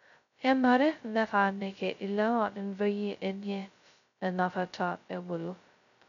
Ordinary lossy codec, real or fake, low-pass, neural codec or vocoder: none; fake; 7.2 kHz; codec, 16 kHz, 0.2 kbps, FocalCodec